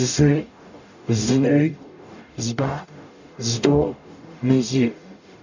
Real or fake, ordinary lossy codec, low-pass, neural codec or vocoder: fake; none; 7.2 kHz; codec, 44.1 kHz, 0.9 kbps, DAC